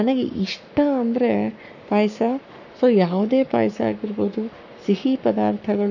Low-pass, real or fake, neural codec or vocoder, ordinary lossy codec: 7.2 kHz; fake; codec, 44.1 kHz, 7.8 kbps, Pupu-Codec; none